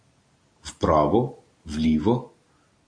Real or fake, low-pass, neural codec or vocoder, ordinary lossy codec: real; 9.9 kHz; none; AAC, 48 kbps